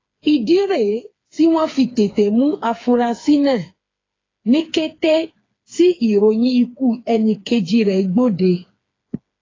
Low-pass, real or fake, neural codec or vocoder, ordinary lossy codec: 7.2 kHz; fake; codec, 16 kHz, 4 kbps, FreqCodec, smaller model; AAC, 32 kbps